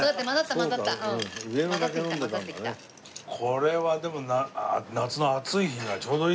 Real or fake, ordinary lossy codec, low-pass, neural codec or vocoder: real; none; none; none